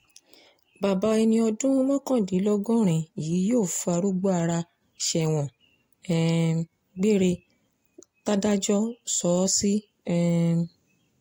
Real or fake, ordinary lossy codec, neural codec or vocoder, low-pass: real; AAC, 48 kbps; none; 19.8 kHz